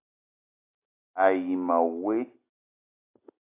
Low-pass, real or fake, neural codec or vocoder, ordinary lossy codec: 3.6 kHz; real; none; AAC, 24 kbps